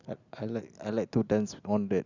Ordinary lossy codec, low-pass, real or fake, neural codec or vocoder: Opus, 64 kbps; 7.2 kHz; real; none